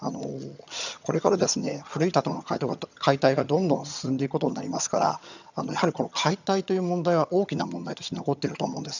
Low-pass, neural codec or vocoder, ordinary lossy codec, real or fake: 7.2 kHz; vocoder, 22.05 kHz, 80 mel bands, HiFi-GAN; none; fake